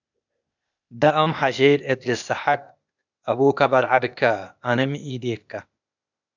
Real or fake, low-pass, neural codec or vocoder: fake; 7.2 kHz; codec, 16 kHz, 0.8 kbps, ZipCodec